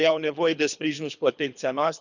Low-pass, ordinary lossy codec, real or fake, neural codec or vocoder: 7.2 kHz; none; fake; codec, 24 kHz, 3 kbps, HILCodec